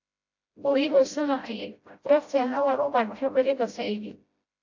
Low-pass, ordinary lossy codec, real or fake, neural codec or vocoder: 7.2 kHz; AAC, 48 kbps; fake; codec, 16 kHz, 0.5 kbps, FreqCodec, smaller model